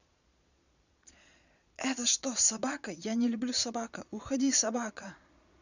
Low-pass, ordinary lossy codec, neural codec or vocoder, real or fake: 7.2 kHz; none; vocoder, 22.05 kHz, 80 mel bands, Vocos; fake